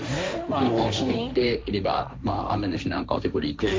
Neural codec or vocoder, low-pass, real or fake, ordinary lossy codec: codec, 16 kHz, 1.1 kbps, Voila-Tokenizer; none; fake; none